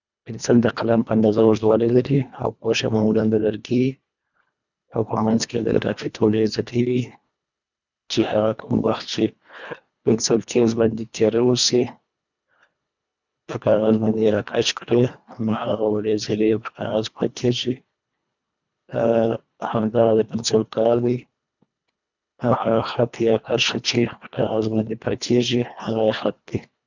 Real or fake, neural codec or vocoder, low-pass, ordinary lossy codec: fake; codec, 24 kHz, 1.5 kbps, HILCodec; 7.2 kHz; none